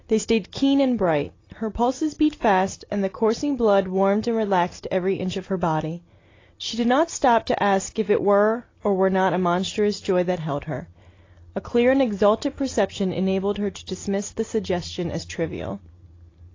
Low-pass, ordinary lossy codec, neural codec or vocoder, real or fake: 7.2 kHz; AAC, 32 kbps; none; real